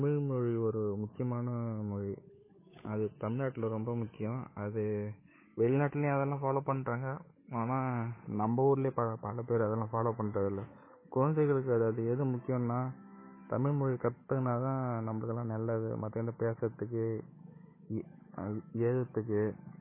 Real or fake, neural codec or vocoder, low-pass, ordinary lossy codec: fake; codec, 16 kHz, 8 kbps, FunCodec, trained on Chinese and English, 25 frames a second; 3.6 kHz; MP3, 16 kbps